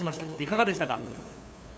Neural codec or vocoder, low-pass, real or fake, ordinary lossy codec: codec, 16 kHz, 2 kbps, FunCodec, trained on LibriTTS, 25 frames a second; none; fake; none